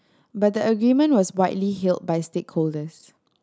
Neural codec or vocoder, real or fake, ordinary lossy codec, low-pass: none; real; none; none